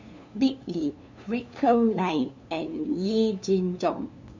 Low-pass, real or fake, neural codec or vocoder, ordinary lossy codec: 7.2 kHz; fake; codec, 16 kHz, 2 kbps, FunCodec, trained on LibriTTS, 25 frames a second; MP3, 64 kbps